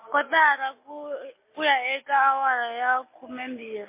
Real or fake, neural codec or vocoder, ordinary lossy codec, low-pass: real; none; MP3, 24 kbps; 3.6 kHz